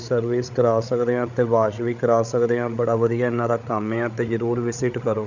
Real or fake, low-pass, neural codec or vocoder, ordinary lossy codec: fake; 7.2 kHz; codec, 16 kHz, 8 kbps, FreqCodec, larger model; none